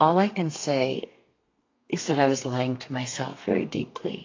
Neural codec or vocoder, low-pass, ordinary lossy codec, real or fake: codec, 32 kHz, 1.9 kbps, SNAC; 7.2 kHz; AAC, 32 kbps; fake